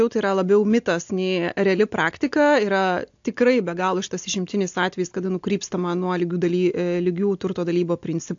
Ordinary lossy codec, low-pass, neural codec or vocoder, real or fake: AAC, 64 kbps; 7.2 kHz; none; real